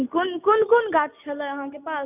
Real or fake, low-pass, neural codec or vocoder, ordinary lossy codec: real; 3.6 kHz; none; none